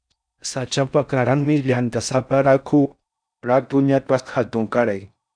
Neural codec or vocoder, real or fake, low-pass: codec, 16 kHz in and 24 kHz out, 0.6 kbps, FocalCodec, streaming, 4096 codes; fake; 9.9 kHz